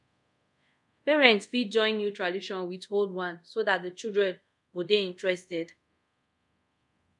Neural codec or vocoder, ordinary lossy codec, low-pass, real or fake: codec, 24 kHz, 0.5 kbps, DualCodec; none; 10.8 kHz; fake